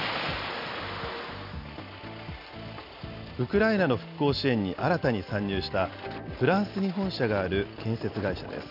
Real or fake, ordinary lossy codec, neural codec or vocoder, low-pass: real; none; none; 5.4 kHz